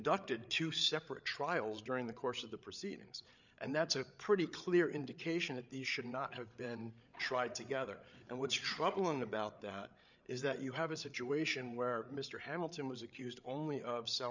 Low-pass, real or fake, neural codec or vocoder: 7.2 kHz; fake; codec, 16 kHz, 8 kbps, FreqCodec, larger model